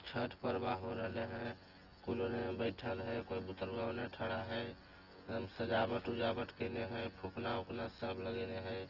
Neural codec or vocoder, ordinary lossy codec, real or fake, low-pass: vocoder, 24 kHz, 100 mel bands, Vocos; Opus, 16 kbps; fake; 5.4 kHz